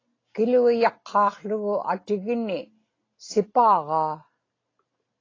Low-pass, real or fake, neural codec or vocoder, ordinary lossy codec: 7.2 kHz; real; none; AAC, 32 kbps